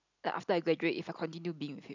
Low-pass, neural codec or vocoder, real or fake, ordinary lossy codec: 7.2 kHz; none; real; none